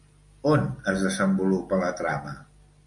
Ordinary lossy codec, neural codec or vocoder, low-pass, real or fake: MP3, 48 kbps; none; 10.8 kHz; real